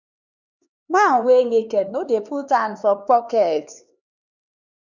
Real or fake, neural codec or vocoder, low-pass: fake; codec, 16 kHz, 4 kbps, X-Codec, HuBERT features, trained on LibriSpeech; 7.2 kHz